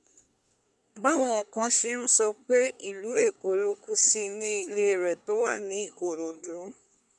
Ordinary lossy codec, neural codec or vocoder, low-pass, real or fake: none; codec, 24 kHz, 1 kbps, SNAC; none; fake